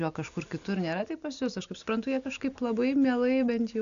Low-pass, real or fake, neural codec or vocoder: 7.2 kHz; real; none